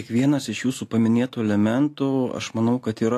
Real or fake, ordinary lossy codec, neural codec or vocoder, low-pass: real; AAC, 64 kbps; none; 14.4 kHz